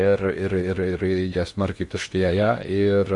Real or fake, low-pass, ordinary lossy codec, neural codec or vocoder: fake; 10.8 kHz; MP3, 48 kbps; codec, 16 kHz in and 24 kHz out, 0.6 kbps, FocalCodec, streaming, 2048 codes